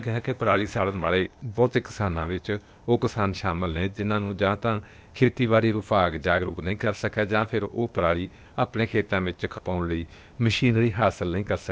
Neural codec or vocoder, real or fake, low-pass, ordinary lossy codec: codec, 16 kHz, 0.8 kbps, ZipCodec; fake; none; none